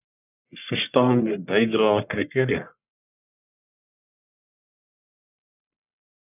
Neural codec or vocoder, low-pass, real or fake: codec, 44.1 kHz, 1.7 kbps, Pupu-Codec; 3.6 kHz; fake